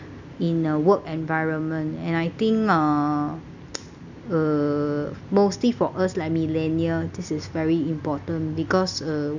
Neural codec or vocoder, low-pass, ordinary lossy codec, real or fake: none; 7.2 kHz; none; real